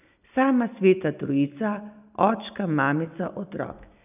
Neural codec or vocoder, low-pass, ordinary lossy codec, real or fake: none; 3.6 kHz; none; real